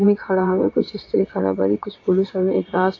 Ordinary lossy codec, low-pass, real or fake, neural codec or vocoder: AAC, 32 kbps; 7.2 kHz; fake; vocoder, 22.05 kHz, 80 mel bands, WaveNeXt